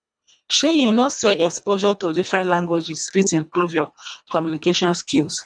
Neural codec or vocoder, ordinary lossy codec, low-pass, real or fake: codec, 24 kHz, 1.5 kbps, HILCodec; none; 9.9 kHz; fake